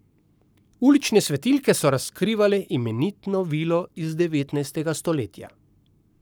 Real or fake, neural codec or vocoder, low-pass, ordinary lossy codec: fake; codec, 44.1 kHz, 7.8 kbps, Pupu-Codec; none; none